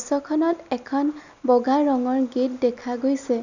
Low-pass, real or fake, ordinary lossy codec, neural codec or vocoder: 7.2 kHz; real; none; none